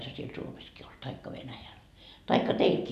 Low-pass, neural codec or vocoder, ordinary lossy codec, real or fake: 14.4 kHz; none; none; real